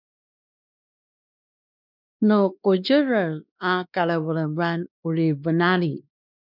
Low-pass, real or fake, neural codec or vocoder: 5.4 kHz; fake; codec, 16 kHz, 2 kbps, X-Codec, WavLM features, trained on Multilingual LibriSpeech